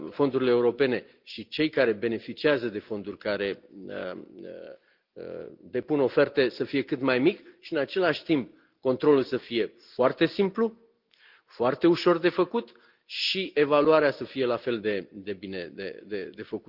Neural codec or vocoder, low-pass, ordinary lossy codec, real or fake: none; 5.4 kHz; Opus, 24 kbps; real